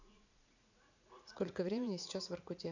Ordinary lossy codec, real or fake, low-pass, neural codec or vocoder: none; fake; 7.2 kHz; vocoder, 44.1 kHz, 80 mel bands, Vocos